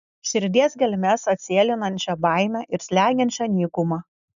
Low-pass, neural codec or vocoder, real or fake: 7.2 kHz; none; real